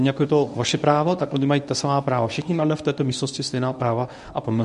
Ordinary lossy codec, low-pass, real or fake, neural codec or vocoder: MP3, 96 kbps; 10.8 kHz; fake; codec, 24 kHz, 0.9 kbps, WavTokenizer, medium speech release version 1